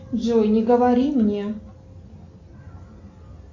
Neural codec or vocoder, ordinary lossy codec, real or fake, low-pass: none; AAC, 48 kbps; real; 7.2 kHz